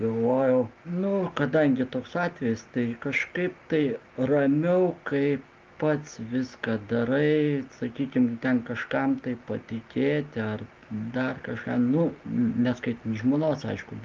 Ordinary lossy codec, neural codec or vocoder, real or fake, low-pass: Opus, 32 kbps; none; real; 7.2 kHz